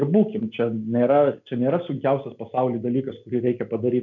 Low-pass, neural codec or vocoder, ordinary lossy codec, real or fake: 7.2 kHz; none; MP3, 64 kbps; real